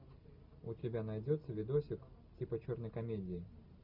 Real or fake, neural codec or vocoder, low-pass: real; none; 5.4 kHz